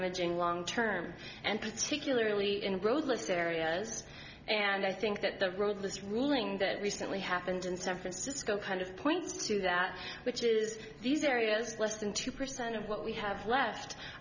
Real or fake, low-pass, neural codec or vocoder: real; 7.2 kHz; none